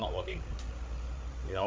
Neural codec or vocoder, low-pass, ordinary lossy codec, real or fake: codec, 16 kHz, 16 kbps, FreqCodec, larger model; none; none; fake